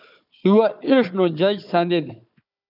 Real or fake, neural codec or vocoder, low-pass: fake; codec, 16 kHz, 4 kbps, FunCodec, trained on Chinese and English, 50 frames a second; 5.4 kHz